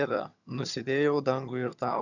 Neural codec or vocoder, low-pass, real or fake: vocoder, 22.05 kHz, 80 mel bands, HiFi-GAN; 7.2 kHz; fake